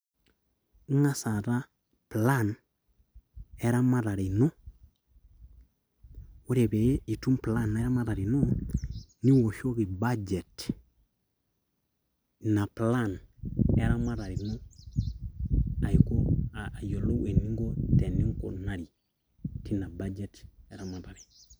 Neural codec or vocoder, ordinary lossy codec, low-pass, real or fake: none; none; none; real